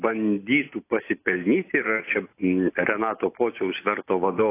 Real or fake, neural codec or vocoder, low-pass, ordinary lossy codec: real; none; 3.6 kHz; AAC, 24 kbps